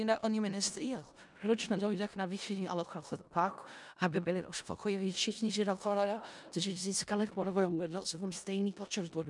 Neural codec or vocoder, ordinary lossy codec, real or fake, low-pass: codec, 16 kHz in and 24 kHz out, 0.4 kbps, LongCat-Audio-Codec, four codebook decoder; MP3, 96 kbps; fake; 10.8 kHz